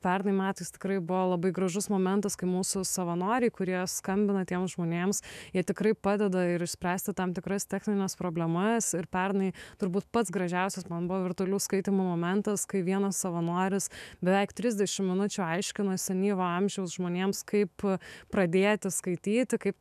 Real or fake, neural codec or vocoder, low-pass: fake; autoencoder, 48 kHz, 128 numbers a frame, DAC-VAE, trained on Japanese speech; 14.4 kHz